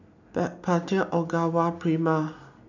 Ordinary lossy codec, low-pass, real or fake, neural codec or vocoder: none; 7.2 kHz; real; none